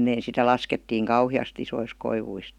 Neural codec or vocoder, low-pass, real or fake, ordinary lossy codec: none; 19.8 kHz; real; none